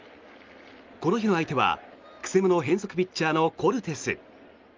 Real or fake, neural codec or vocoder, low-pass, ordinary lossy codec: real; none; 7.2 kHz; Opus, 32 kbps